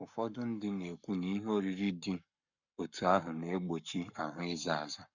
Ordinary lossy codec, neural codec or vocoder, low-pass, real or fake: none; codec, 44.1 kHz, 7.8 kbps, Pupu-Codec; 7.2 kHz; fake